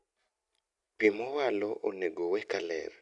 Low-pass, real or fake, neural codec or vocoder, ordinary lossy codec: 10.8 kHz; real; none; none